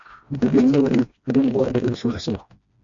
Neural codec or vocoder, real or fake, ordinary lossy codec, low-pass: codec, 16 kHz, 1 kbps, FreqCodec, smaller model; fake; MP3, 48 kbps; 7.2 kHz